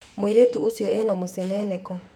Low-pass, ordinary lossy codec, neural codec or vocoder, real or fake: 19.8 kHz; none; autoencoder, 48 kHz, 32 numbers a frame, DAC-VAE, trained on Japanese speech; fake